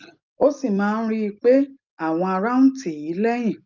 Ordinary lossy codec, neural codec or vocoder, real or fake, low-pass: Opus, 24 kbps; none; real; 7.2 kHz